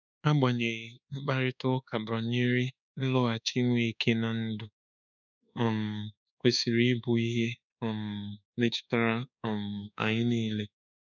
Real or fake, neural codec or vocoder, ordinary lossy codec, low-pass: fake; codec, 24 kHz, 1.2 kbps, DualCodec; none; 7.2 kHz